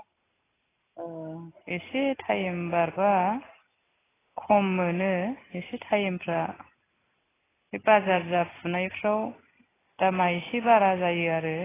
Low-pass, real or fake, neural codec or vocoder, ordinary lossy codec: 3.6 kHz; real; none; AAC, 16 kbps